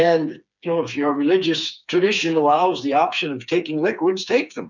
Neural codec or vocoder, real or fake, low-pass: codec, 16 kHz, 4 kbps, FreqCodec, smaller model; fake; 7.2 kHz